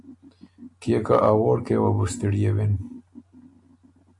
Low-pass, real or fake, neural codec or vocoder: 10.8 kHz; real; none